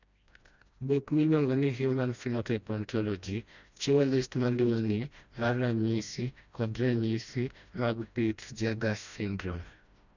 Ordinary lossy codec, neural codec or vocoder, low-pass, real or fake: none; codec, 16 kHz, 1 kbps, FreqCodec, smaller model; 7.2 kHz; fake